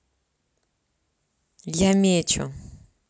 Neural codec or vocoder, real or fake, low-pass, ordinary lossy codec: none; real; none; none